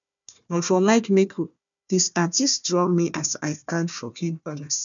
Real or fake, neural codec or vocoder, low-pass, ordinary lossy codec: fake; codec, 16 kHz, 1 kbps, FunCodec, trained on Chinese and English, 50 frames a second; 7.2 kHz; none